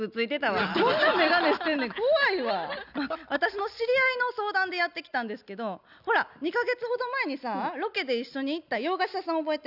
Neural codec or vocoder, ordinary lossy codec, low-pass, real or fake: none; none; 5.4 kHz; real